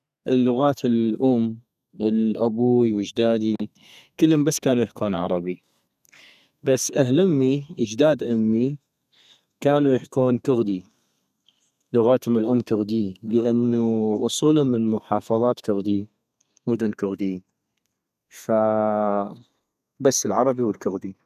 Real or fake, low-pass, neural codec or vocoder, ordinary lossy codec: fake; 14.4 kHz; codec, 32 kHz, 1.9 kbps, SNAC; none